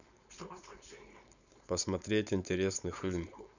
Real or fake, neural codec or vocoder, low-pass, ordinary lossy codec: fake; codec, 16 kHz, 4.8 kbps, FACodec; 7.2 kHz; none